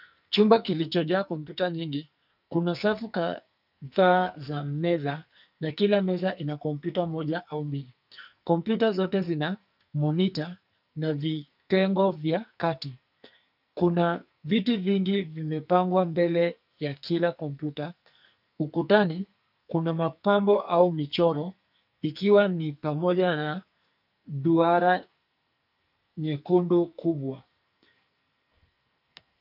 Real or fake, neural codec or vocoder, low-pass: fake; codec, 44.1 kHz, 2.6 kbps, SNAC; 5.4 kHz